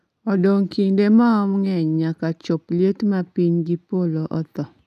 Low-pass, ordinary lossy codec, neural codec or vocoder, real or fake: 14.4 kHz; none; none; real